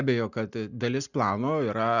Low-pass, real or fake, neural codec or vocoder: 7.2 kHz; real; none